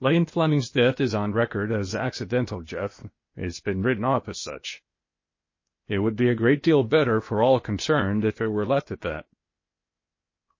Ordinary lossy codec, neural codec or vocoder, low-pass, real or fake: MP3, 32 kbps; codec, 16 kHz, 0.8 kbps, ZipCodec; 7.2 kHz; fake